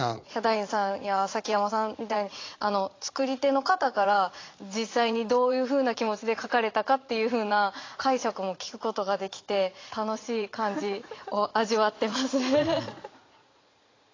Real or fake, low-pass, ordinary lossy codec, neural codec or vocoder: fake; 7.2 kHz; AAC, 32 kbps; vocoder, 44.1 kHz, 80 mel bands, Vocos